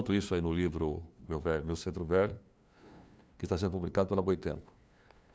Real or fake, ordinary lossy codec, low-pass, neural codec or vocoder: fake; none; none; codec, 16 kHz, 2 kbps, FunCodec, trained on LibriTTS, 25 frames a second